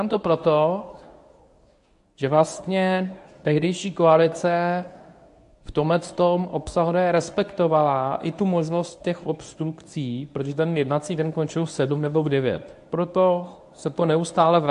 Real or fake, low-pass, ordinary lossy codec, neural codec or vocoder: fake; 10.8 kHz; AAC, 64 kbps; codec, 24 kHz, 0.9 kbps, WavTokenizer, medium speech release version 1